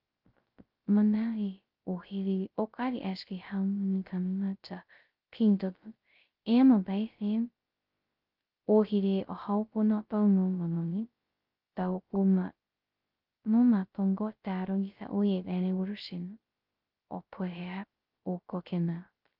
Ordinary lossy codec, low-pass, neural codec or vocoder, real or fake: Opus, 24 kbps; 5.4 kHz; codec, 16 kHz, 0.2 kbps, FocalCodec; fake